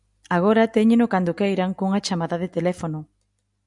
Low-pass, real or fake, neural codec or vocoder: 10.8 kHz; real; none